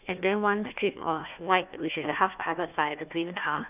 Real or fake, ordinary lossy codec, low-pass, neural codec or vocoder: fake; none; 3.6 kHz; codec, 16 kHz, 1 kbps, FunCodec, trained on Chinese and English, 50 frames a second